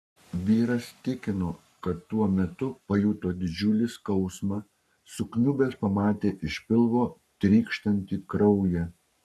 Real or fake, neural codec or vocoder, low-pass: fake; codec, 44.1 kHz, 7.8 kbps, Pupu-Codec; 14.4 kHz